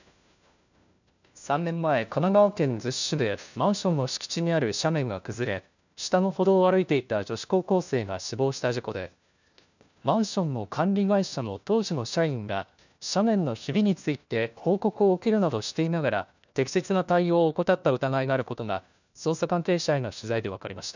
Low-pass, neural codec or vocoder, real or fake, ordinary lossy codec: 7.2 kHz; codec, 16 kHz, 1 kbps, FunCodec, trained on LibriTTS, 50 frames a second; fake; none